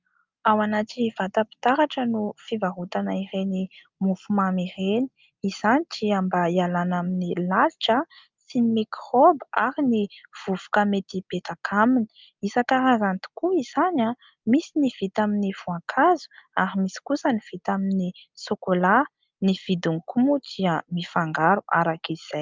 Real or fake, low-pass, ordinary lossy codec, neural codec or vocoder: real; 7.2 kHz; Opus, 24 kbps; none